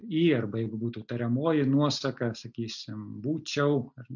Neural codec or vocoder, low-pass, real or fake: none; 7.2 kHz; real